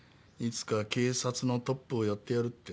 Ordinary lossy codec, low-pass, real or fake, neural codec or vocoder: none; none; real; none